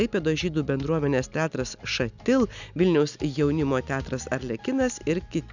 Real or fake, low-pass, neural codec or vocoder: real; 7.2 kHz; none